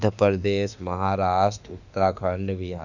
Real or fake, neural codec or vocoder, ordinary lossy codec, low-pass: fake; autoencoder, 48 kHz, 32 numbers a frame, DAC-VAE, trained on Japanese speech; none; 7.2 kHz